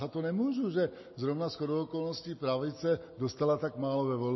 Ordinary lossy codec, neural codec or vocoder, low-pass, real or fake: MP3, 24 kbps; none; 7.2 kHz; real